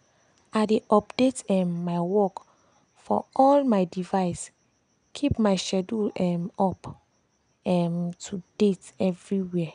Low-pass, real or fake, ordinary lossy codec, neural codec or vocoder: 9.9 kHz; real; none; none